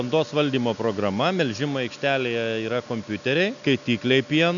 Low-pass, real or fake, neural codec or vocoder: 7.2 kHz; real; none